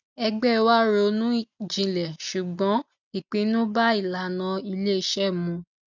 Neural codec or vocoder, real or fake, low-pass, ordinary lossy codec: none; real; 7.2 kHz; none